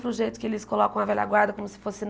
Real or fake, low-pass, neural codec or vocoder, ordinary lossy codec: real; none; none; none